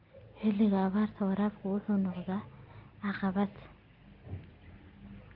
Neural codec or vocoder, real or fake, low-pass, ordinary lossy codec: none; real; 5.4 kHz; Opus, 24 kbps